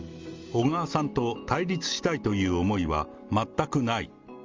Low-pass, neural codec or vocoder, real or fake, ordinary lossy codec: 7.2 kHz; none; real; Opus, 32 kbps